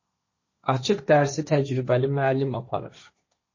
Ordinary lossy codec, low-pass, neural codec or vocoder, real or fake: MP3, 32 kbps; 7.2 kHz; codec, 16 kHz, 1.1 kbps, Voila-Tokenizer; fake